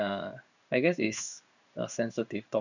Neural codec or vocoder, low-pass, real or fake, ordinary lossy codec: codec, 16 kHz in and 24 kHz out, 1 kbps, XY-Tokenizer; 7.2 kHz; fake; none